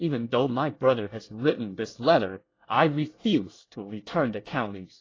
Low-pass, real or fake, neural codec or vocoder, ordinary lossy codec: 7.2 kHz; fake; codec, 24 kHz, 1 kbps, SNAC; AAC, 32 kbps